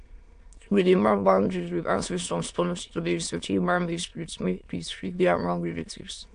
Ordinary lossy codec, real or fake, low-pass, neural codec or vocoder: AAC, 64 kbps; fake; 9.9 kHz; autoencoder, 22.05 kHz, a latent of 192 numbers a frame, VITS, trained on many speakers